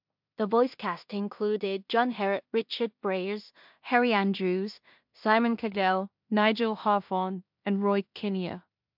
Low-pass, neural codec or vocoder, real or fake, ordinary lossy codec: 5.4 kHz; codec, 16 kHz in and 24 kHz out, 0.4 kbps, LongCat-Audio-Codec, two codebook decoder; fake; MP3, 48 kbps